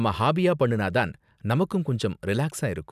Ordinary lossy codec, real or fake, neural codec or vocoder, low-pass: none; real; none; 14.4 kHz